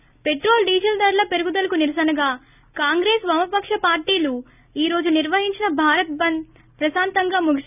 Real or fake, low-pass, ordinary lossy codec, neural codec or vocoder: real; 3.6 kHz; none; none